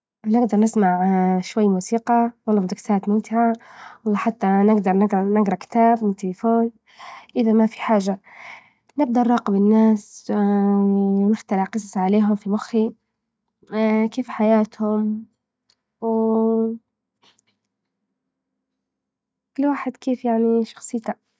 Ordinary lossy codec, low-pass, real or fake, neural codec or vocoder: none; none; real; none